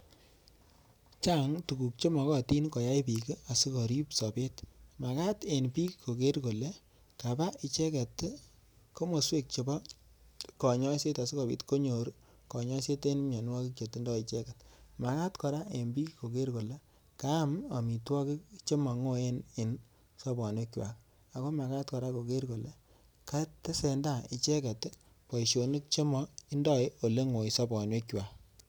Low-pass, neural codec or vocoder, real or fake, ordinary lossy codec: none; none; real; none